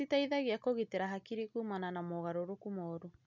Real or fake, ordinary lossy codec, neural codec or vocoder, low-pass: real; none; none; 7.2 kHz